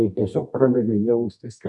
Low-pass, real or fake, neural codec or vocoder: 10.8 kHz; fake; codec, 24 kHz, 0.9 kbps, WavTokenizer, medium music audio release